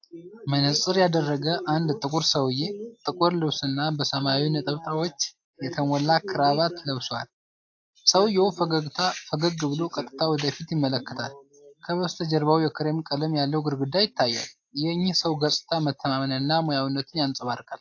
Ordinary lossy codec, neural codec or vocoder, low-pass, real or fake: AAC, 48 kbps; none; 7.2 kHz; real